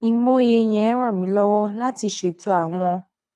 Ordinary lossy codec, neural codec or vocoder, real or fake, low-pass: none; codec, 24 kHz, 3 kbps, HILCodec; fake; none